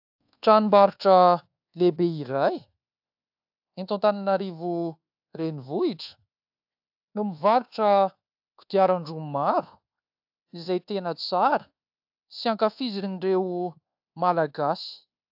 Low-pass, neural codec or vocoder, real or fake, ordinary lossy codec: 5.4 kHz; codec, 24 kHz, 1.2 kbps, DualCodec; fake; AAC, 48 kbps